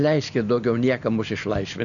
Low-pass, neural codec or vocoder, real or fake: 7.2 kHz; none; real